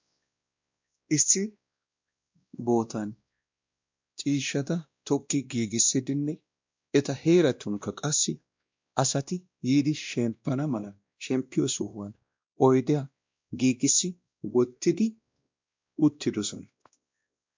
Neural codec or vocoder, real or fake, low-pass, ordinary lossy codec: codec, 16 kHz, 1 kbps, X-Codec, WavLM features, trained on Multilingual LibriSpeech; fake; 7.2 kHz; MP3, 64 kbps